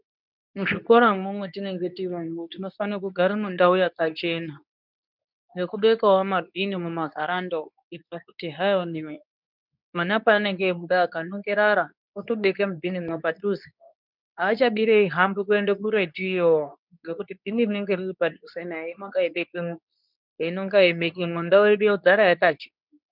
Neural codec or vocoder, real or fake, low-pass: codec, 24 kHz, 0.9 kbps, WavTokenizer, medium speech release version 2; fake; 5.4 kHz